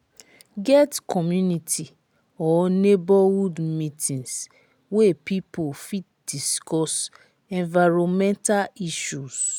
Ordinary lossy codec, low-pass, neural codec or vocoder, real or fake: none; none; none; real